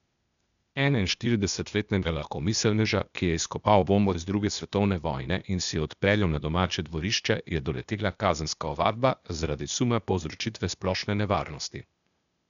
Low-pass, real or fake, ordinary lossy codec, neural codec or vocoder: 7.2 kHz; fake; none; codec, 16 kHz, 0.8 kbps, ZipCodec